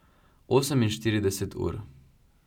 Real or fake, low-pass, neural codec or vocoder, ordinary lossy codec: real; 19.8 kHz; none; none